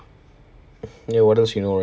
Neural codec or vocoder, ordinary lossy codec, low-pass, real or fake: none; none; none; real